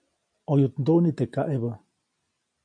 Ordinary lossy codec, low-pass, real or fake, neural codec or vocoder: MP3, 96 kbps; 9.9 kHz; real; none